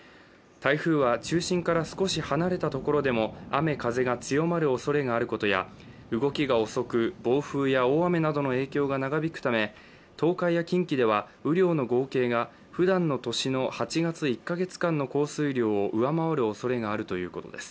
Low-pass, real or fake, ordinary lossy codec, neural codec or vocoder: none; real; none; none